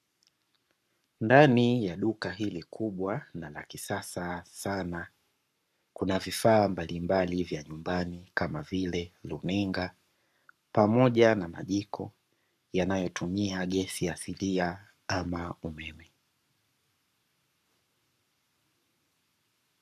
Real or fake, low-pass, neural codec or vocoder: fake; 14.4 kHz; codec, 44.1 kHz, 7.8 kbps, Pupu-Codec